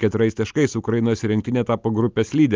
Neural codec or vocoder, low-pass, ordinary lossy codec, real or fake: none; 7.2 kHz; Opus, 24 kbps; real